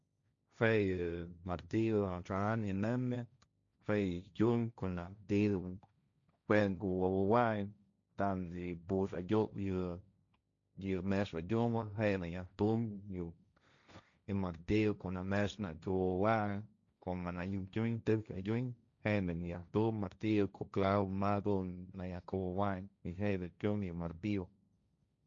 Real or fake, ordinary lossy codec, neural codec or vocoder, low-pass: fake; none; codec, 16 kHz, 1.1 kbps, Voila-Tokenizer; 7.2 kHz